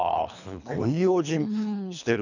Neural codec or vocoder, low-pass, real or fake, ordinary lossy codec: codec, 24 kHz, 3 kbps, HILCodec; 7.2 kHz; fake; none